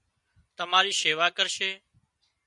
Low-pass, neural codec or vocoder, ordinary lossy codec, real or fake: 10.8 kHz; none; MP3, 96 kbps; real